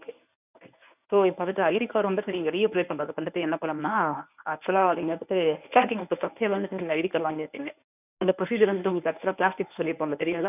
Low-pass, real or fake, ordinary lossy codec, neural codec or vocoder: 3.6 kHz; fake; none; codec, 24 kHz, 0.9 kbps, WavTokenizer, medium speech release version 1